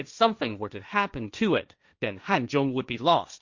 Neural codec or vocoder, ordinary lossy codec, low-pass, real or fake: codec, 16 kHz, 1.1 kbps, Voila-Tokenizer; Opus, 64 kbps; 7.2 kHz; fake